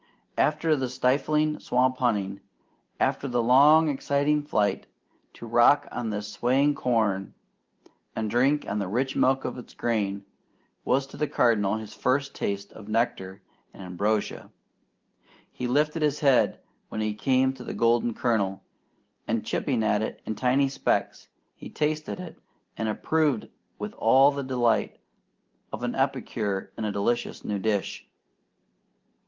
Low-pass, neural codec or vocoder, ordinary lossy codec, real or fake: 7.2 kHz; none; Opus, 32 kbps; real